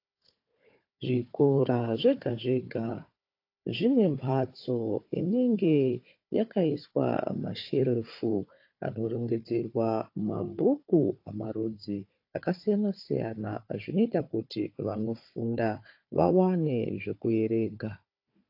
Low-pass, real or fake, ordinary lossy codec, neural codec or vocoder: 5.4 kHz; fake; AAC, 32 kbps; codec, 16 kHz, 4 kbps, FunCodec, trained on Chinese and English, 50 frames a second